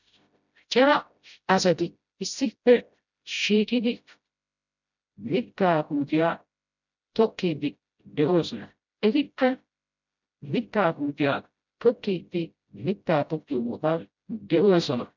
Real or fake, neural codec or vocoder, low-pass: fake; codec, 16 kHz, 0.5 kbps, FreqCodec, smaller model; 7.2 kHz